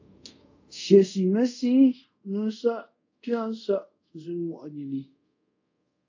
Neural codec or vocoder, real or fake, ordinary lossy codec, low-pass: codec, 24 kHz, 0.5 kbps, DualCodec; fake; AAC, 48 kbps; 7.2 kHz